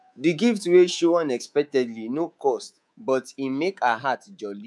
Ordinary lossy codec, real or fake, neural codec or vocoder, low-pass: none; fake; codec, 24 kHz, 3.1 kbps, DualCodec; none